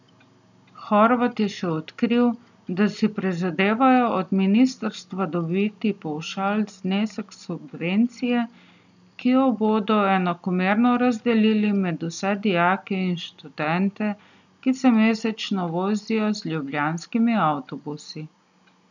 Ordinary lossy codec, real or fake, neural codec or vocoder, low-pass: none; real; none; 7.2 kHz